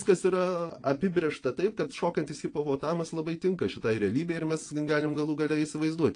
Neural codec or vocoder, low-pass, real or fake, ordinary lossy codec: vocoder, 22.05 kHz, 80 mel bands, WaveNeXt; 9.9 kHz; fake; AAC, 48 kbps